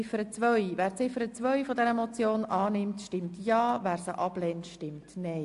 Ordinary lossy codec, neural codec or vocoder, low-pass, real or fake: AAC, 64 kbps; none; 10.8 kHz; real